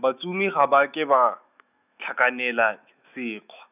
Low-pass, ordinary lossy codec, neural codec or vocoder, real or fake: 3.6 kHz; none; autoencoder, 48 kHz, 128 numbers a frame, DAC-VAE, trained on Japanese speech; fake